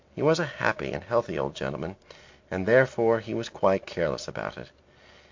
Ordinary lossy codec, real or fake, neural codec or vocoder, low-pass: MP3, 48 kbps; real; none; 7.2 kHz